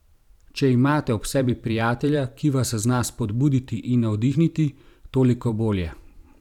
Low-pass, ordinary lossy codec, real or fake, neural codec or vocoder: 19.8 kHz; none; fake; vocoder, 44.1 kHz, 128 mel bands every 512 samples, BigVGAN v2